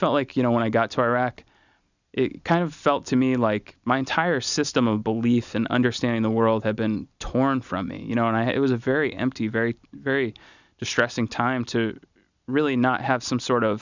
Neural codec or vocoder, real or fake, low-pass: none; real; 7.2 kHz